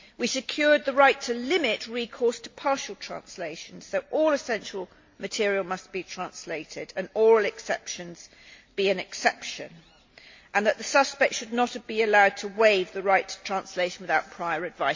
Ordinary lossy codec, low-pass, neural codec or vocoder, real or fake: MP3, 48 kbps; 7.2 kHz; none; real